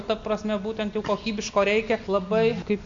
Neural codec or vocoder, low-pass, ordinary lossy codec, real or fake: none; 7.2 kHz; MP3, 48 kbps; real